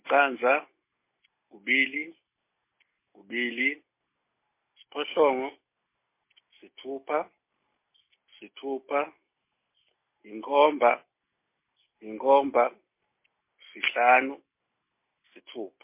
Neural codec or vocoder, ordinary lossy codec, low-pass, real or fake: none; MP3, 24 kbps; 3.6 kHz; real